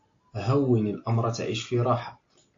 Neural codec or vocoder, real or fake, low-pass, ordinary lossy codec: none; real; 7.2 kHz; AAC, 48 kbps